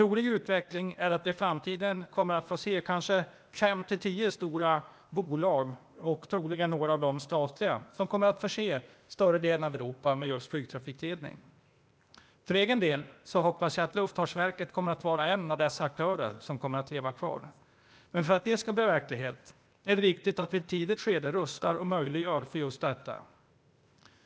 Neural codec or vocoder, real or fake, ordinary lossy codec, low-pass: codec, 16 kHz, 0.8 kbps, ZipCodec; fake; none; none